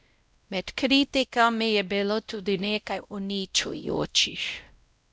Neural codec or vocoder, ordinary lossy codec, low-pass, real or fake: codec, 16 kHz, 0.5 kbps, X-Codec, WavLM features, trained on Multilingual LibriSpeech; none; none; fake